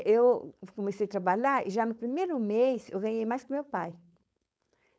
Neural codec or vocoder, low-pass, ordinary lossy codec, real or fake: codec, 16 kHz, 4.8 kbps, FACodec; none; none; fake